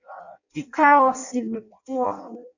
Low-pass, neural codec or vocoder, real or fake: 7.2 kHz; codec, 16 kHz in and 24 kHz out, 0.6 kbps, FireRedTTS-2 codec; fake